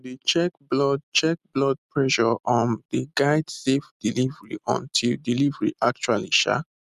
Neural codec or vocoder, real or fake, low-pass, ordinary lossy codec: none; real; 14.4 kHz; none